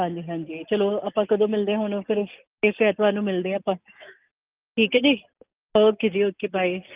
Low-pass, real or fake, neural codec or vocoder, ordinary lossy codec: 3.6 kHz; real; none; Opus, 24 kbps